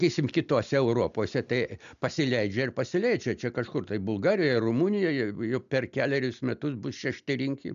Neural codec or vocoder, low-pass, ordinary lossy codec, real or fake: none; 7.2 kHz; AAC, 96 kbps; real